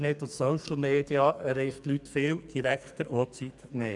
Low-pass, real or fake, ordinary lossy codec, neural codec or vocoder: 10.8 kHz; fake; none; codec, 44.1 kHz, 2.6 kbps, SNAC